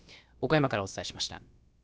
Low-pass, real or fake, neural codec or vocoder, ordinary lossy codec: none; fake; codec, 16 kHz, about 1 kbps, DyCAST, with the encoder's durations; none